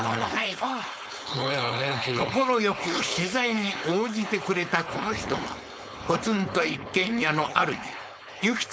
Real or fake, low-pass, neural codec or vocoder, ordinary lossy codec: fake; none; codec, 16 kHz, 4.8 kbps, FACodec; none